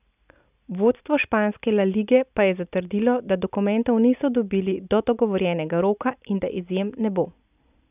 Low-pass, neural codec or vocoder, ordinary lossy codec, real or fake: 3.6 kHz; none; none; real